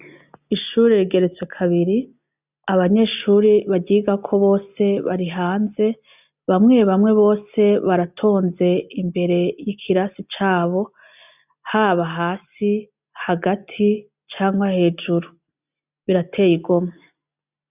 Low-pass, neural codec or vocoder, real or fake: 3.6 kHz; none; real